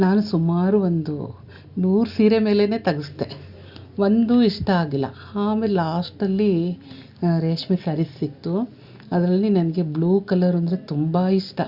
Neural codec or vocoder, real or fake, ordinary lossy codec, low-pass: none; real; Opus, 64 kbps; 5.4 kHz